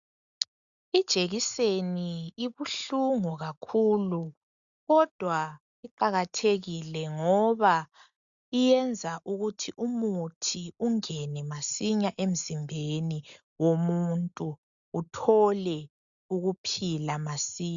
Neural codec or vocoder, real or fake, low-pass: none; real; 7.2 kHz